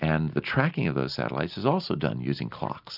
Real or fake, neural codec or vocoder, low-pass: real; none; 5.4 kHz